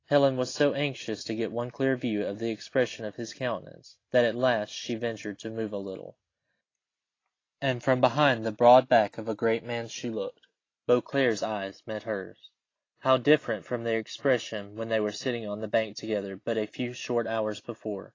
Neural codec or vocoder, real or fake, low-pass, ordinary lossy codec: none; real; 7.2 kHz; AAC, 32 kbps